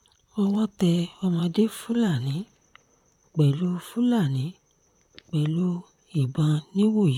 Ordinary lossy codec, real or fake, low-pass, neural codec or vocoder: none; fake; 19.8 kHz; vocoder, 44.1 kHz, 128 mel bands, Pupu-Vocoder